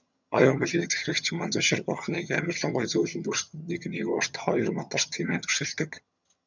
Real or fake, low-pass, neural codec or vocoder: fake; 7.2 kHz; vocoder, 22.05 kHz, 80 mel bands, HiFi-GAN